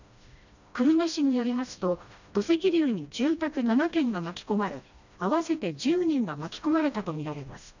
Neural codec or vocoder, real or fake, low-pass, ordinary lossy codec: codec, 16 kHz, 1 kbps, FreqCodec, smaller model; fake; 7.2 kHz; none